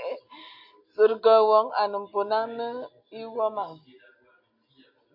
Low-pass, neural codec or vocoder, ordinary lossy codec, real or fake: 5.4 kHz; none; MP3, 32 kbps; real